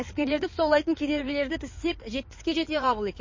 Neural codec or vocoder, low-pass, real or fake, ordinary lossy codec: codec, 16 kHz in and 24 kHz out, 2.2 kbps, FireRedTTS-2 codec; 7.2 kHz; fake; MP3, 32 kbps